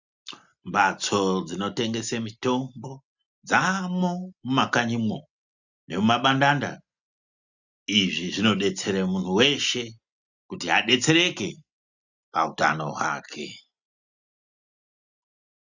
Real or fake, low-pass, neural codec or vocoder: real; 7.2 kHz; none